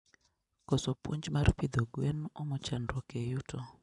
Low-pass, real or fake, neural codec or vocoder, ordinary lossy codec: 10.8 kHz; real; none; none